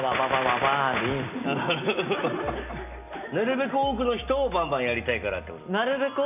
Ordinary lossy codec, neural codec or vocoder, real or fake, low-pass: none; none; real; 3.6 kHz